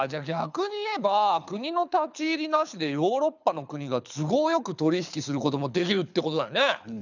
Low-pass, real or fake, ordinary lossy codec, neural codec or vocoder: 7.2 kHz; fake; none; codec, 24 kHz, 6 kbps, HILCodec